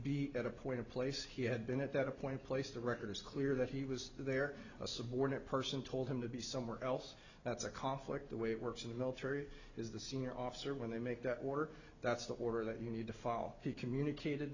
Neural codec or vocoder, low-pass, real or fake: none; 7.2 kHz; real